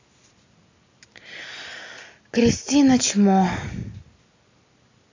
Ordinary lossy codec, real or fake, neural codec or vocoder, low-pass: AAC, 48 kbps; real; none; 7.2 kHz